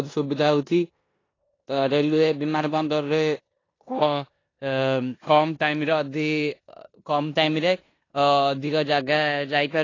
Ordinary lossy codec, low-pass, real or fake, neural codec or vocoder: AAC, 32 kbps; 7.2 kHz; fake; codec, 16 kHz in and 24 kHz out, 0.9 kbps, LongCat-Audio-Codec, four codebook decoder